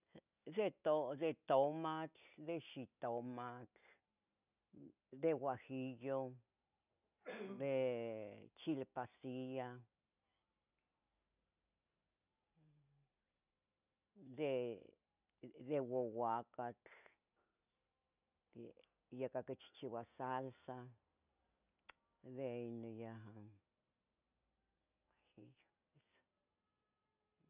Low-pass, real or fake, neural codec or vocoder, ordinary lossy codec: 3.6 kHz; real; none; none